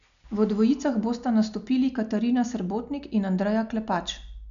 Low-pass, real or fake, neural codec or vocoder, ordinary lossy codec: 7.2 kHz; real; none; none